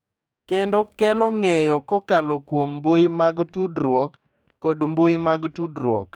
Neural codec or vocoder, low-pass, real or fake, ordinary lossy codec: codec, 44.1 kHz, 2.6 kbps, DAC; 19.8 kHz; fake; none